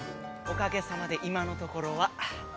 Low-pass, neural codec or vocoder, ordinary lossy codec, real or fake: none; none; none; real